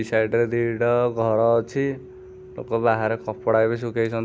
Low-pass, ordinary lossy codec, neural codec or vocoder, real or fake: none; none; none; real